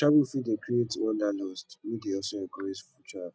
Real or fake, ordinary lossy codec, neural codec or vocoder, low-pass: real; none; none; none